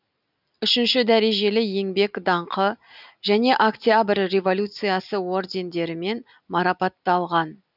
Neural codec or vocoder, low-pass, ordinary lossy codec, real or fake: none; 5.4 kHz; none; real